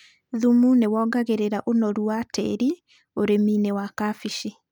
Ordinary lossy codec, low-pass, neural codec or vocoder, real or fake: none; 19.8 kHz; none; real